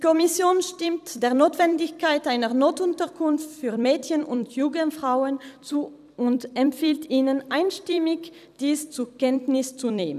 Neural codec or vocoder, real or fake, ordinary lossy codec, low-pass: vocoder, 44.1 kHz, 128 mel bands every 256 samples, BigVGAN v2; fake; none; 14.4 kHz